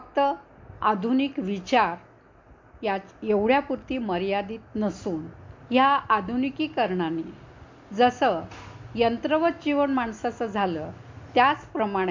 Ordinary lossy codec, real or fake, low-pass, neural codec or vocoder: MP3, 48 kbps; real; 7.2 kHz; none